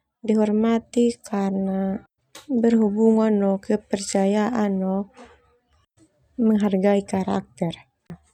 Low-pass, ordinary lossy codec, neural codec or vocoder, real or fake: 19.8 kHz; none; none; real